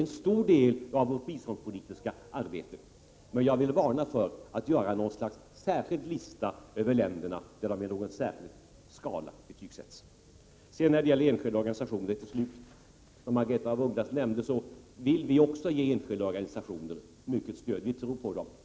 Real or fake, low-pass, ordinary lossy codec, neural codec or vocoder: real; none; none; none